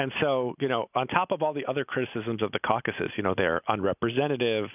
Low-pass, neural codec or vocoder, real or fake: 3.6 kHz; none; real